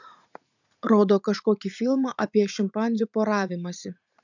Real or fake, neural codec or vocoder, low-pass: fake; vocoder, 44.1 kHz, 80 mel bands, Vocos; 7.2 kHz